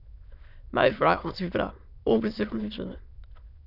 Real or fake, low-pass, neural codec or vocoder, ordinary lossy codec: fake; 5.4 kHz; autoencoder, 22.05 kHz, a latent of 192 numbers a frame, VITS, trained on many speakers; none